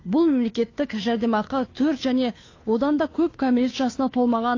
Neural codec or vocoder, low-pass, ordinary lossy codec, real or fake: codec, 16 kHz, 2 kbps, FunCodec, trained on Chinese and English, 25 frames a second; 7.2 kHz; AAC, 32 kbps; fake